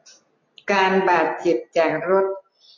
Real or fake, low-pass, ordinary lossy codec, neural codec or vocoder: real; 7.2 kHz; AAC, 32 kbps; none